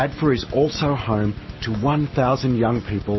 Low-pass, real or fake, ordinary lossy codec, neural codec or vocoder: 7.2 kHz; real; MP3, 24 kbps; none